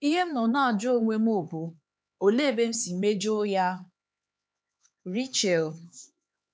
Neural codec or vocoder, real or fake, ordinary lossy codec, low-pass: codec, 16 kHz, 4 kbps, X-Codec, HuBERT features, trained on LibriSpeech; fake; none; none